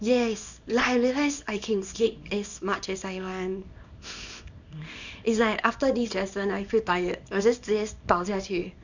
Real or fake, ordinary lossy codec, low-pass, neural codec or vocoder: fake; none; 7.2 kHz; codec, 24 kHz, 0.9 kbps, WavTokenizer, small release